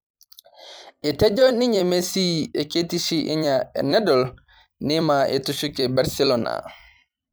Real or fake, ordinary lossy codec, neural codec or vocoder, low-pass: fake; none; vocoder, 44.1 kHz, 128 mel bands every 256 samples, BigVGAN v2; none